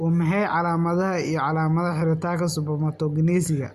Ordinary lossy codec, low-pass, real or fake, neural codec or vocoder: Opus, 64 kbps; 14.4 kHz; real; none